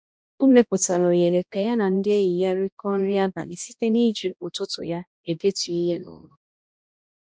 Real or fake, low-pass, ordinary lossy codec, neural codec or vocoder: fake; none; none; codec, 16 kHz, 1 kbps, X-Codec, HuBERT features, trained on balanced general audio